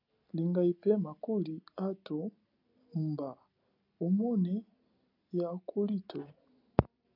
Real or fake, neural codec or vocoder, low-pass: real; none; 5.4 kHz